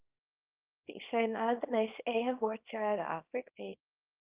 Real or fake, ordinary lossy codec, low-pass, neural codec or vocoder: fake; Opus, 32 kbps; 3.6 kHz; codec, 24 kHz, 0.9 kbps, WavTokenizer, small release